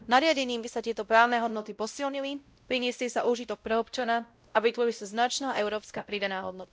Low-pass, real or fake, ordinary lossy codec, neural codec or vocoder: none; fake; none; codec, 16 kHz, 0.5 kbps, X-Codec, WavLM features, trained on Multilingual LibriSpeech